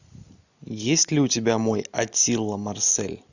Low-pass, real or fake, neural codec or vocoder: 7.2 kHz; real; none